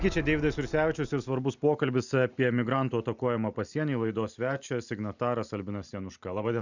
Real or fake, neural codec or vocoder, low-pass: real; none; 7.2 kHz